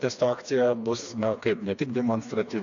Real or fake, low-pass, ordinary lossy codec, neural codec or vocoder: fake; 7.2 kHz; AAC, 64 kbps; codec, 16 kHz, 2 kbps, FreqCodec, smaller model